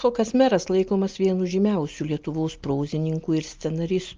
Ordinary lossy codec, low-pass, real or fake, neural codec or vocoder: Opus, 32 kbps; 7.2 kHz; real; none